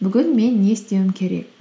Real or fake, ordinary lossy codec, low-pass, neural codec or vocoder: real; none; none; none